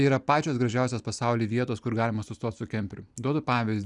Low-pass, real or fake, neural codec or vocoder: 10.8 kHz; real; none